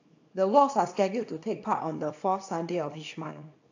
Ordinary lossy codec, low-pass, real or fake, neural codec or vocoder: AAC, 48 kbps; 7.2 kHz; fake; codec, 24 kHz, 0.9 kbps, WavTokenizer, small release